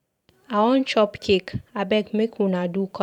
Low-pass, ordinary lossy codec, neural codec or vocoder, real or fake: 19.8 kHz; none; vocoder, 44.1 kHz, 128 mel bands every 512 samples, BigVGAN v2; fake